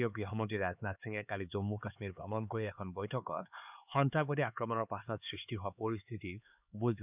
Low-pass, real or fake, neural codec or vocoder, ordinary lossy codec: 3.6 kHz; fake; codec, 16 kHz, 4 kbps, X-Codec, HuBERT features, trained on LibriSpeech; none